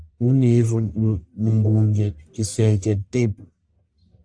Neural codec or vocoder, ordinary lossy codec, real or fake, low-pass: codec, 44.1 kHz, 1.7 kbps, Pupu-Codec; AAC, 64 kbps; fake; 9.9 kHz